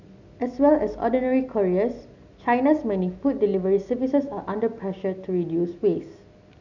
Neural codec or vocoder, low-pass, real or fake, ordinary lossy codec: none; 7.2 kHz; real; none